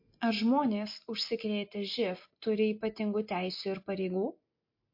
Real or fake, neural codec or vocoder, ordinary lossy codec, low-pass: real; none; MP3, 32 kbps; 5.4 kHz